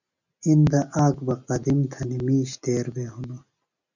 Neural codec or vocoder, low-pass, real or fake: none; 7.2 kHz; real